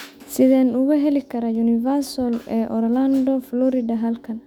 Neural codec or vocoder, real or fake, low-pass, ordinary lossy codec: autoencoder, 48 kHz, 128 numbers a frame, DAC-VAE, trained on Japanese speech; fake; 19.8 kHz; none